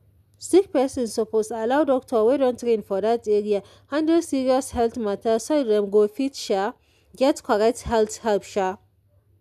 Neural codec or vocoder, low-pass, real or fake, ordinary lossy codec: none; 14.4 kHz; real; none